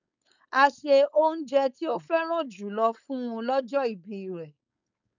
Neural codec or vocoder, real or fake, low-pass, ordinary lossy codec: codec, 16 kHz, 4.8 kbps, FACodec; fake; 7.2 kHz; none